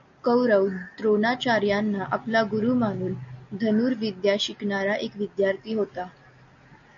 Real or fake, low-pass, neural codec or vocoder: real; 7.2 kHz; none